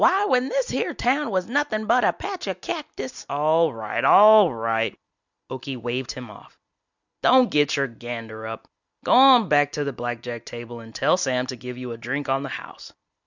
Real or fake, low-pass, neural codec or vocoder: real; 7.2 kHz; none